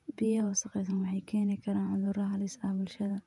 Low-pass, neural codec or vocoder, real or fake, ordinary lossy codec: 10.8 kHz; vocoder, 48 kHz, 128 mel bands, Vocos; fake; none